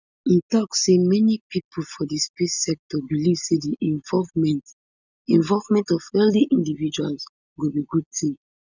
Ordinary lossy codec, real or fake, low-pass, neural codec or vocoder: none; real; 7.2 kHz; none